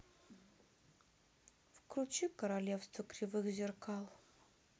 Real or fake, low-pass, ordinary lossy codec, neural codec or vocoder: real; none; none; none